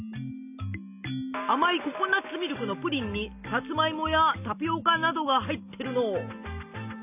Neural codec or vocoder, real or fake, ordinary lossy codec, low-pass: none; real; none; 3.6 kHz